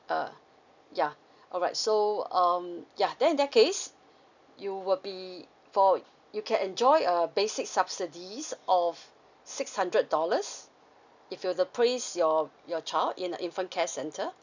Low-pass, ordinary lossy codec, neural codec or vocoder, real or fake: 7.2 kHz; none; none; real